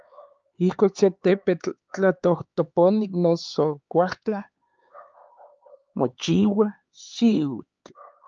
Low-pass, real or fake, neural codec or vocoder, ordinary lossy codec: 7.2 kHz; fake; codec, 16 kHz, 4 kbps, X-Codec, HuBERT features, trained on LibriSpeech; Opus, 24 kbps